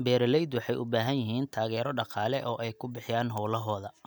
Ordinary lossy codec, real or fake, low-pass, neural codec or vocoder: none; real; none; none